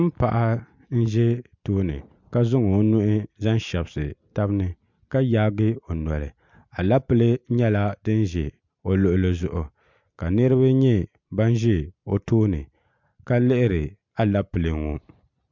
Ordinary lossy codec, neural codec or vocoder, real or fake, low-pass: MP3, 64 kbps; none; real; 7.2 kHz